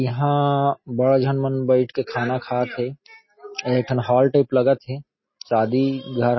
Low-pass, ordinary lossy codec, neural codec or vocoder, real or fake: 7.2 kHz; MP3, 24 kbps; none; real